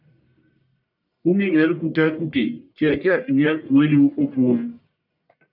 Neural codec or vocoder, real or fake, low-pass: codec, 44.1 kHz, 1.7 kbps, Pupu-Codec; fake; 5.4 kHz